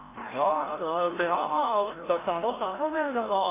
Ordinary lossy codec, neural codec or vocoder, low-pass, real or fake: AAC, 16 kbps; codec, 16 kHz, 0.5 kbps, FreqCodec, larger model; 3.6 kHz; fake